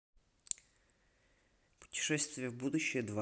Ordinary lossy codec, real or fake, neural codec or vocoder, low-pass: none; real; none; none